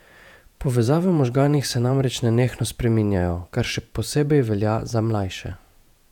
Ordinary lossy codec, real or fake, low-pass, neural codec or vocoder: none; fake; 19.8 kHz; vocoder, 48 kHz, 128 mel bands, Vocos